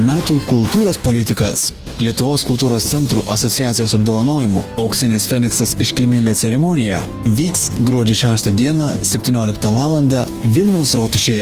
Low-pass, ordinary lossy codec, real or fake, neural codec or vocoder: 14.4 kHz; Opus, 32 kbps; fake; codec, 44.1 kHz, 2.6 kbps, DAC